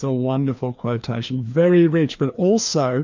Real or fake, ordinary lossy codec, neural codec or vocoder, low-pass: fake; MP3, 64 kbps; codec, 16 kHz, 2 kbps, FreqCodec, larger model; 7.2 kHz